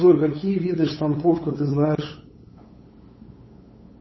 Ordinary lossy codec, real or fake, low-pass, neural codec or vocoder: MP3, 24 kbps; fake; 7.2 kHz; codec, 16 kHz, 8 kbps, FunCodec, trained on LibriTTS, 25 frames a second